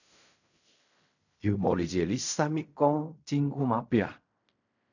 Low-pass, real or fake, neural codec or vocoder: 7.2 kHz; fake; codec, 16 kHz in and 24 kHz out, 0.4 kbps, LongCat-Audio-Codec, fine tuned four codebook decoder